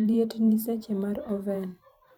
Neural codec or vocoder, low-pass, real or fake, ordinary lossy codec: vocoder, 44.1 kHz, 128 mel bands every 512 samples, BigVGAN v2; 19.8 kHz; fake; none